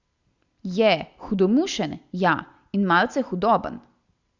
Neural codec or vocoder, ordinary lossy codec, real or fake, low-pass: none; Opus, 64 kbps; real; 7.2 kHz